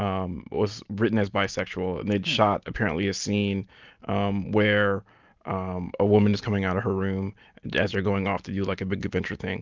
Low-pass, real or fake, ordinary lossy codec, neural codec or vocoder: 7.2 kHz; real; Opus, 32 kbps; none